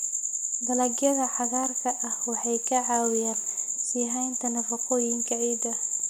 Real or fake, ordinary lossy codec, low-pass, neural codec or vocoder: real; none; none; none